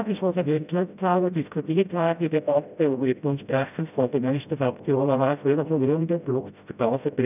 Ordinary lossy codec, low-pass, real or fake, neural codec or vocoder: none; 3.6 kHz; fake; codec, 16 kHz, 0.5 kbps, FreqCodec, smaller model